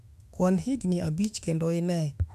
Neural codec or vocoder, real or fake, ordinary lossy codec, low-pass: autoencoder, 48 kHz, 32 numbers a frame, DAC-VAE, trained on Japanese speech; fake; AAC, 96 kbps; 14.4 kHz